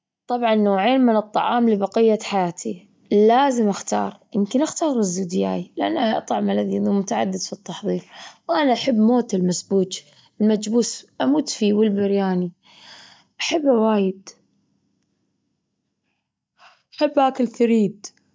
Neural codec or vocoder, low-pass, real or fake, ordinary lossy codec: none; none; real; none